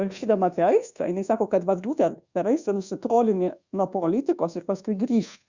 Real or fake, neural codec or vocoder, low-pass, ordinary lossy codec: fake; codec, 24 kHz, 1.2 kbps, DualCodec; 7.2 kHz; Opus, 64 kbps